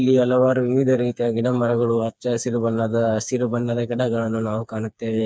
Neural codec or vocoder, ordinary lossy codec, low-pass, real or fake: codec, 16 kHz, 4 kbps, FreqCodec, smaller model; none; none; fake